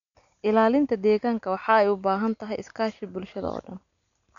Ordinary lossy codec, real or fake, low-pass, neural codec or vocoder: none; real; 7.2 kHz; none